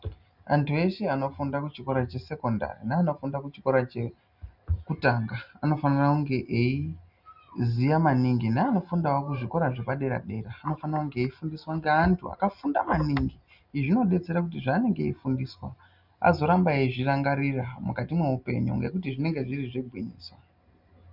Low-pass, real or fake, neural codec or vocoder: 5.4 kHz; real; none